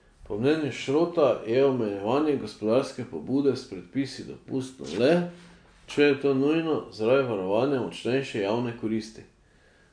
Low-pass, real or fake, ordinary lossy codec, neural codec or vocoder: 9.9 kHz; real; MP3, 64 kbps; none